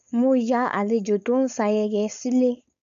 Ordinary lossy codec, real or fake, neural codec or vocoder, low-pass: none; fake; codec, 16 kHz, 4.8 kbps, FACodec; 7.2 kHz